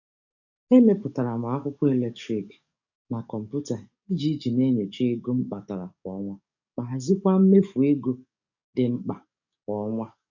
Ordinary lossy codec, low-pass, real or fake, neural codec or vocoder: none; 7.2 kHz; fake; codec, 44.1 kHz, 7.8 kbps, DAC